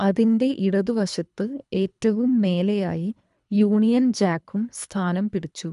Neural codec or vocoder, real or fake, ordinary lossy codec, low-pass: codec, 24 kHz, 3 kbps, HILCodec; fake; none; 10.8 kHz